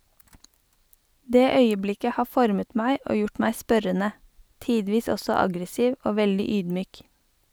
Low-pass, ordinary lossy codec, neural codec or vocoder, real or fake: none; none; none; real